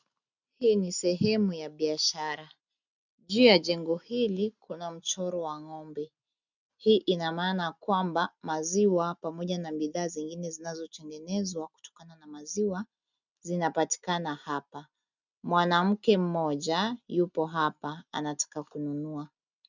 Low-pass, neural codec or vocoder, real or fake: 7.2 kHz; none; real